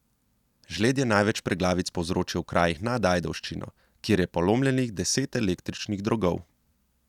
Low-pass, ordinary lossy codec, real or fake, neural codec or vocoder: 19.8 kHz; none; real; none